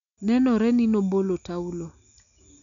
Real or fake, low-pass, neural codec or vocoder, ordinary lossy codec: real; 7.2 kHz; none; none